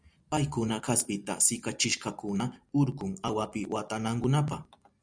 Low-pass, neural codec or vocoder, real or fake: 9.9 kHz; none; real